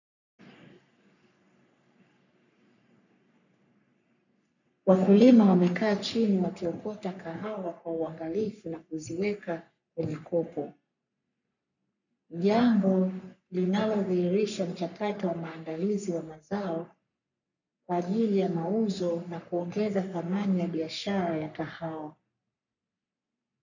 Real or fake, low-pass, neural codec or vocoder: fake; 7.2 kHz; codec, 44.1 kHz, 3.4 kbps, Pupu-Codec